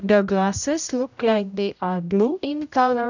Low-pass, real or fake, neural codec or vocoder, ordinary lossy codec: 7.2 kHz; fake; codec, 16 kHz, 0.5 kbps, X-Codec, HuBERT features, trained on general audio; none